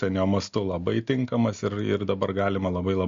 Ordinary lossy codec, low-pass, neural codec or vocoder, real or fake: MP3, 48 kbps; 7.2 kHz; none; real